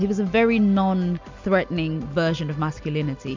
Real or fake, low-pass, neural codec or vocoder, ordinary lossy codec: real; 7.2 kHz; none; MP3, 64 kbps